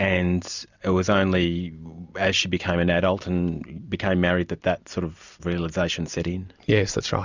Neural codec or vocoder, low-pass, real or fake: none; 7.2 kHz; real